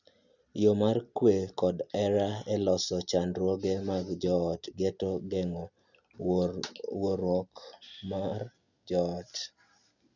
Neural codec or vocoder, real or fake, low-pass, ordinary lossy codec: none; real; 7.2 kHz; none